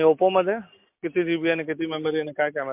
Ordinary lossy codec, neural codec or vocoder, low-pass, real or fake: MP3, 32 kbps; none; 3.6 kHz; real